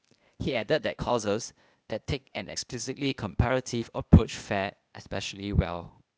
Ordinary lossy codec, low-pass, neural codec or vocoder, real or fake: none; none; codec, 16 kHz, 0.8 kbps, ZipCodec; fake